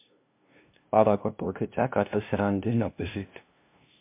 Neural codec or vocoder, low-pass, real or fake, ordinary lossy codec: codec, 16 kHz, 0.5 kbps, FunCodec, trained on LibriTTS, 25 frames a second; 3.6 kHz; fake; MP3, 32 kbps